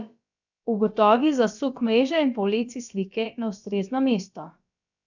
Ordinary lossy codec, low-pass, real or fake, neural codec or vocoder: none; 7.2 kHz; fake; codec, 16 kHz, about 1 kbps, DyCAST, with the encoder's durations